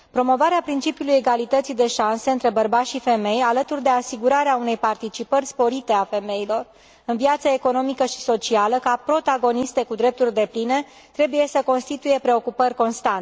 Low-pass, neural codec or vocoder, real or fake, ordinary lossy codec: none; none; real; none